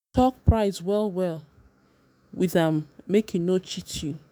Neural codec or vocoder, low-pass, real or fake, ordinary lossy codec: autoencoder, 48 kHz, 128 numbers a frame, DAC-VAE, trained on Japanese speech; none; fake; none